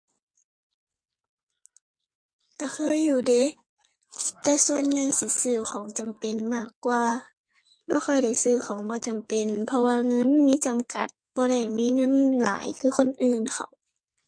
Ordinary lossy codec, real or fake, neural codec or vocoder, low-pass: MP3, 48 kbps; fake; codec, 44.1 kHz, 2.6 kbps, SNAC; 9.9 kHz